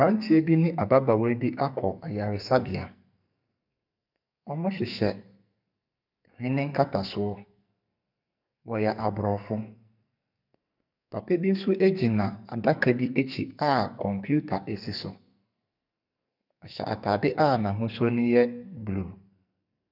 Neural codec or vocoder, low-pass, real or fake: codec, 44.1 kHz, 2.6 kbps, SNAC; 5.4 kHz; fake